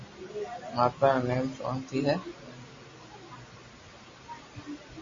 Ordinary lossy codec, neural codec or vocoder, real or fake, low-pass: MP3, 32 kbps; none; real; 7.2 kHz